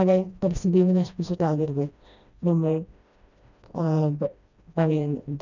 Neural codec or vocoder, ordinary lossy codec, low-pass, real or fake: codec, 16 kHz, 1 kbps, FreqCodec, smaller model; none; 7.2 kHz; fake